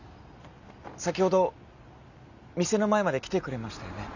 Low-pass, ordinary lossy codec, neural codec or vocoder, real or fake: 7.2 kHz; none; none; real